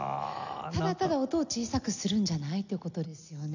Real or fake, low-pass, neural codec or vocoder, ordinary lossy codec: real; 7.2 kHz; none; none